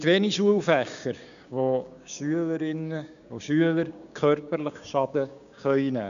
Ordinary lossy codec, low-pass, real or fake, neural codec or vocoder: none; 7.2 kHz; fake; codec, 16 kHz, 6 kbps, DAC